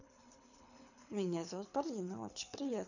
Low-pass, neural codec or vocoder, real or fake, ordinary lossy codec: 7.2 kHz; codec, 24 kHz, 6 kbps, HILCodec; fake; none